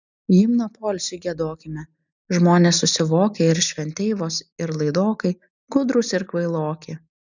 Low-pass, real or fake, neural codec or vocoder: 7.2 kHz; real; none